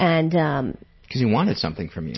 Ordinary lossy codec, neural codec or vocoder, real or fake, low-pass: MP3, 24 kbps; none; real; 7.2 kHz